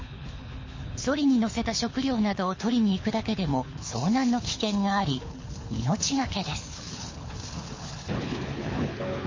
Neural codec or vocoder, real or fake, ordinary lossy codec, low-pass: codec, 24 kHz, 6 kbps, HILCodec; fake; MP3, 32 kbps; 7.2 kHz